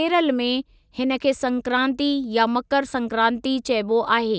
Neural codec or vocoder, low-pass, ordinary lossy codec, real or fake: none; none; none; real